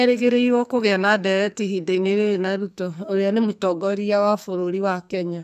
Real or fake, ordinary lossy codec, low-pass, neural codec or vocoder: fake; none; 14.4 kHz; codec, 44.1 kHz, 2.6 kbps, SNAC